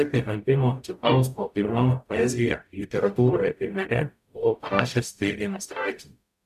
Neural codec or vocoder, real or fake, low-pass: codec, 44.1 kHz, 0.9 kbps, DAC; fake; 14.4 kHz